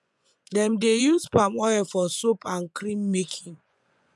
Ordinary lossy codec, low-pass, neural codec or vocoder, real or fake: none; none; vocoder, 24 kHz, 100 mel bands, Vocos; fake